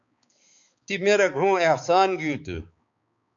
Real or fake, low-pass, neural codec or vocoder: fake; 7.2 kHz; codec, 16 kHz, 4 kbps, X-Codec, HuBERT features, trained on balanced general audio